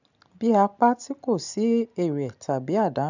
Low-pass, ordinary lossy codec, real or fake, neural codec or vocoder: 7.2 kHz; none; real; none